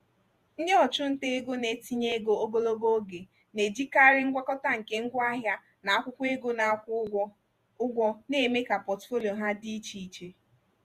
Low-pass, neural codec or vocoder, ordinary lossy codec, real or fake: 14.4 kHz; vocoder, 48 kHz, 128 mel bands, Vocos; Opus, 64 kbps; fake